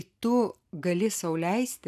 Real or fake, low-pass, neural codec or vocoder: real; 14.4 kHz; none